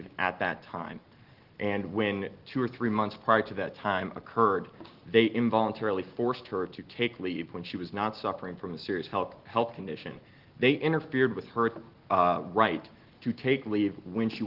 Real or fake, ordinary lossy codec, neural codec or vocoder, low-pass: real; Opus, 16 kbps; none; 5.4 kHz